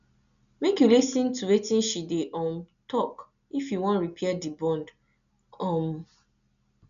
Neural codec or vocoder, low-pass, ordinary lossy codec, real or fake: none; 7.2 kHz; none; real